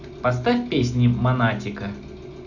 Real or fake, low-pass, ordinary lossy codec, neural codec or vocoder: real; 7.2 kHz; none; none